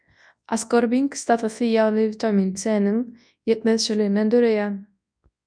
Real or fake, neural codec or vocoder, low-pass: fake; codec, 24 kHz, 0.9 kbps, WavTokenizer, large speech release; 9.9 kHz